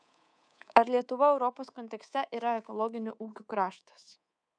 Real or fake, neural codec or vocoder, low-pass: fake; codec, 24 kHz, 3.1 kbps, DualCodec; 9.9 kHz